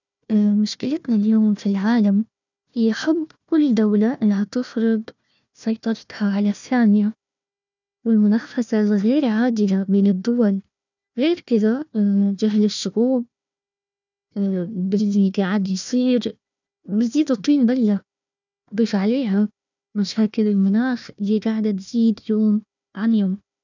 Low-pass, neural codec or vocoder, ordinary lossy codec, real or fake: 7.2 kHz; codec, 16 kHz, 1 kbps, FunCodec, trained on Chinese and English, 50 frames a second; none; fake